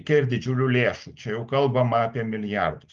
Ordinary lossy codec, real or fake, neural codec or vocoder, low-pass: Opus, 32 kbps; real; none; 7.2 kHz